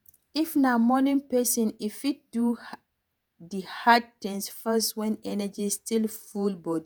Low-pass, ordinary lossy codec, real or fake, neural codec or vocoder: none; none; fake; vocoder, 48 kHz, 128 mel bands, Vocos